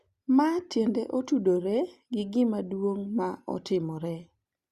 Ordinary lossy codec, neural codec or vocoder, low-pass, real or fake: Opus, 64 kbps; none; 14.4 kHz; real